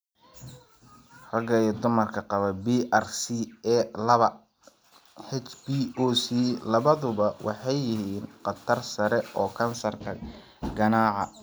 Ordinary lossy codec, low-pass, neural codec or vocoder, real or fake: none; none; none; real